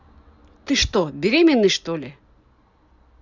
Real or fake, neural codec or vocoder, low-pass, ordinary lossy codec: real; none; 7.2 kHz; Opus, 64 kbps